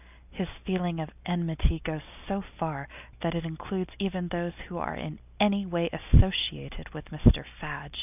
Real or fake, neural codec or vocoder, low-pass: real; none; 3.6 kHz